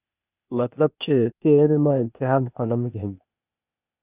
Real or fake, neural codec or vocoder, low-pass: fake; codec, 16 kHz, 0.8 kbps, ZipCodec; 3.6 kHz